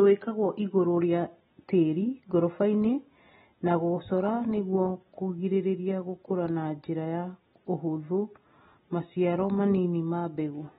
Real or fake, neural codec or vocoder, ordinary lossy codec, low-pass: real; none; AAC, 16 kbps; 19.8 kHz